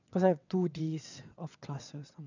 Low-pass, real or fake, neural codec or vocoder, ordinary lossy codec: 7.2 kHz; fake; vocoder, 22.05 kHz, 80 mel bands, WaveNeXt; none